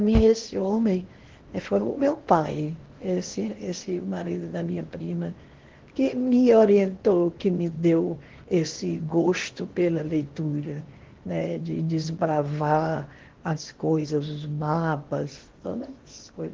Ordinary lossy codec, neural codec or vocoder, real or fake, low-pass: Opus, 16 kbps; codec, 16 kHz in and 24 kHz out, 0.8 kbps, FocalCodec, streaming, 65536 codes; fake; 7.2 kHz